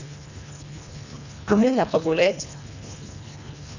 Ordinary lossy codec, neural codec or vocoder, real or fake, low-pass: none; codec, 24 kHz, 1.5 kbps, HILCodec; fake; 7.2 kHz